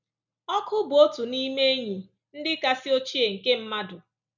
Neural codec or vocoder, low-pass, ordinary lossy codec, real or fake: none; 7.2 kHz; none; real